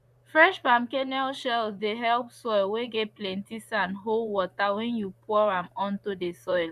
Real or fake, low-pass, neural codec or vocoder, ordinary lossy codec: fake; 14.4 kHz; vocoder, 44.1 kHz, 128 mel bands, Pupu-Vocoder; AAC, 96 kbps